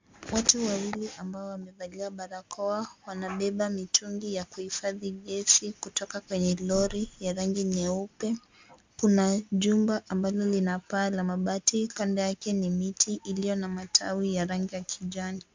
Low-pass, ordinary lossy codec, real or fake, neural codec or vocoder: 7.2 kHz; MP3, 64 kbps; real; none